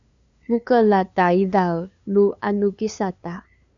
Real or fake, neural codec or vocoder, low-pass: fake; codec, 16 kHz, 2 kbps, FunCodec, trained on LibriTTS, 25 frames a second; 7.2 kHz